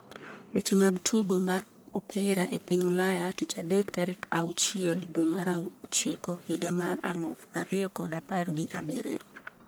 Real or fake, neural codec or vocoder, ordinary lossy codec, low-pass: fake; codec, 44.1 kHz, 1.7 kbps, Pupu-Codec; none; none